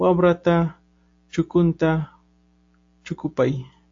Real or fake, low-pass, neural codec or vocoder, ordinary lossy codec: real; 7.2 kHz; none; AAC, 48 kbps